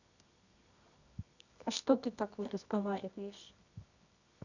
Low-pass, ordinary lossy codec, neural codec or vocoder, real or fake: 7.2 kHz; none; codec, 24 kHz, 0.9 kbps, WavTokenizer, medium music audio release; fake